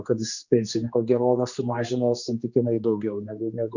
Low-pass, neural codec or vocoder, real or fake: 7.2 kHz; codec, 16 kHz, 2 kbps, X-Codec, HuBERT features, trained on general audio; fake